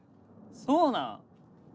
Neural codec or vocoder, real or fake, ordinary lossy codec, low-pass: none; real; none; none